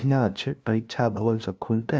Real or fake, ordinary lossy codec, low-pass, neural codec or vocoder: fake; none; none; codec, 16 kHz, 0.5 kbps, FunCodec, trained on LibriTTS, 25 frames a second